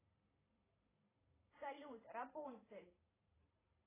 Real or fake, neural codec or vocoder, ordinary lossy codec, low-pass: fake; vocoder, 44.1 kHz, 128 mel bands every 512 samples, BigVGAN v2; AAC, 16 kbps; 3.6 kHz